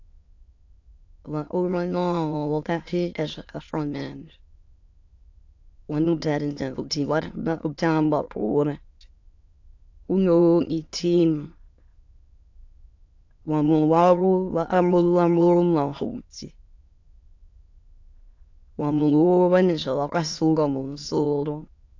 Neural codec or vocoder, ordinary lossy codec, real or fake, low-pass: autoencoder, 22.05 kHz, a latent of 192 numbers a frame, VITS, trained on many speakers; AAC, 48 kbps; fake; 7.2 kHz